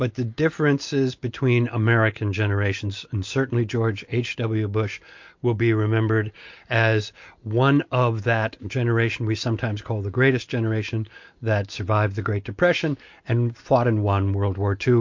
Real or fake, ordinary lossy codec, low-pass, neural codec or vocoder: real; MP3, 48 kbps; 7.2 kHz; none